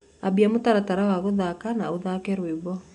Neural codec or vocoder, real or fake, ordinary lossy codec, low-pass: none; real; none; 10.8 kHz